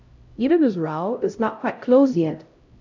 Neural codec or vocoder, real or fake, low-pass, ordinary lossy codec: codec, 16 kHz, 0.5 kbps, X-Codec, HuBERT features, trained on LibriSpeech; fake; 7.2 kHz; MP3, 48 kbps